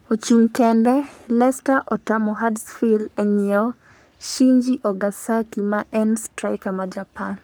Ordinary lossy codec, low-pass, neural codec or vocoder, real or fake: none; none; codec, 44.1 kHz, 3.4 kbps, Pupu-Codec; fake